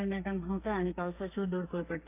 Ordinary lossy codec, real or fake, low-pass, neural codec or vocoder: AAC, 24 kbps; fake; 3.6 kHz; codec, 32 kHz, 1.9 kbps, SNAC